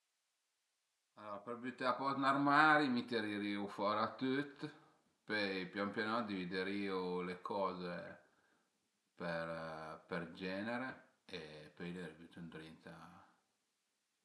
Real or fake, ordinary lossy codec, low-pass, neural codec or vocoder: real; none; none; none